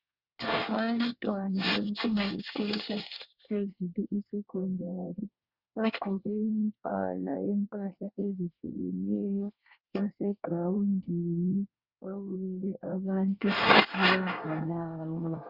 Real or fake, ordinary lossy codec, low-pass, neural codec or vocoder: fake; Opus, 64 kbps; 5.4 kHz; codec, 24 kHz, 1 kbps, SNAC